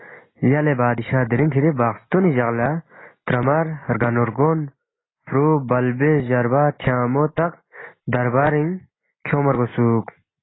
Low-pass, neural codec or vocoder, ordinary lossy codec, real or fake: 7.2 kHz; none; AAC, 16 kbps; real